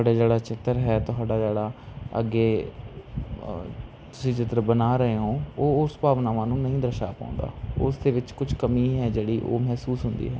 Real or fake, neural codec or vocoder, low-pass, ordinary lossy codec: real; none; none; none